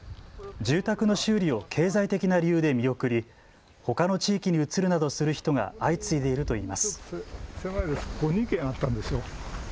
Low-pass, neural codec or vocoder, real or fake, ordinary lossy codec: none; none; real; none